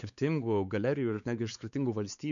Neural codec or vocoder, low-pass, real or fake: codec, 16 kHz, 4 kbps, X-Codec, WavLM features, trained on Multilingual LibriSpeech; 7.2 kHz; fake